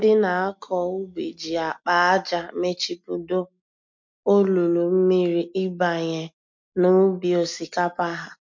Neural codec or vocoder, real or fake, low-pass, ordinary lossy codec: none; real; 7.2 kHz; MP3, 48 kbps